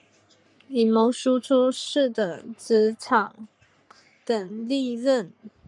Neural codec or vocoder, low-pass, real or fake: codec, 44.1 kHz, 3.4 kbps, Pupu-Codec; 10.8 kHz; fake